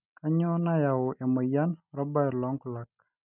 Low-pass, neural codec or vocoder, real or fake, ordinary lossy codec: 3.6 kHz; none; real; none